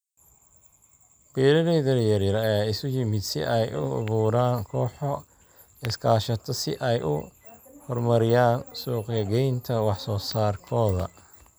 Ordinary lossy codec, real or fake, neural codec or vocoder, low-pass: none; real; none; none